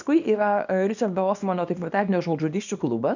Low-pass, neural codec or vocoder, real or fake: 7.2 kHz; codec, 24 kHz, 0.9 kbps, WavTokenizer, small release; fake